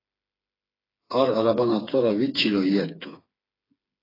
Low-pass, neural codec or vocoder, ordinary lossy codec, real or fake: 5.4 kHz; codec, 16 kHz, 4 kbps, FreqCodec, smaller model; AAC, 24 kbps; fake